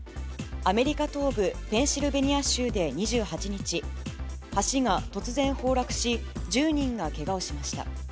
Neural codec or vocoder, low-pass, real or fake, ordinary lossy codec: none; none; real; none